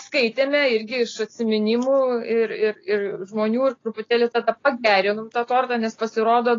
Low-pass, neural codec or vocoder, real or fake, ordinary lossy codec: 7.2 kHz; none; real; AAC, 32 kbps